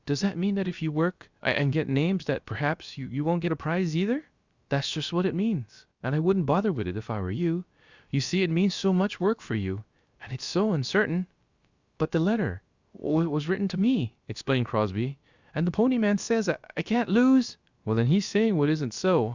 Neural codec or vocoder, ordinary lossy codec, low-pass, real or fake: codec, 16 kHz, about 1 kbps, DyCAST, with the encoder's durations; Opus, 64 kbps; 7.2 kHz; fake